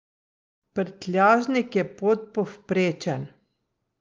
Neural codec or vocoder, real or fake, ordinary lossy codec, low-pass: none; real; Opus, 32 kbps; 7.2 kHz